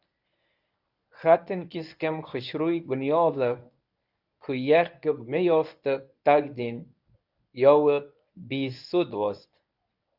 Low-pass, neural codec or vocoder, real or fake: 5.4 kHz; codec, 24 kHz, 0.9 kbps, WavTokenizer, medium speech release version 1; fake